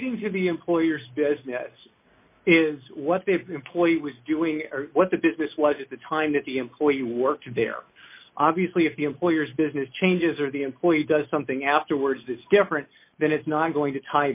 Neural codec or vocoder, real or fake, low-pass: none; real; 3.6 kHz